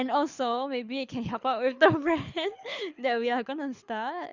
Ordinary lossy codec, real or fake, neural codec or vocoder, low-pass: Opus, 64 kbps; fake; codec, 24 kHz, 6 kbps, HILCodec; 7.2 kHz